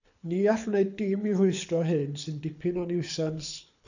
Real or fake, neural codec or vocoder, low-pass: fake; codec, 24 kHz, 6 kbps, HILCodec; 7.2 kHz